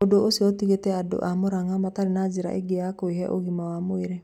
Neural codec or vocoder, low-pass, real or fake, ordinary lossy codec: none; 19.8 kHz; real; none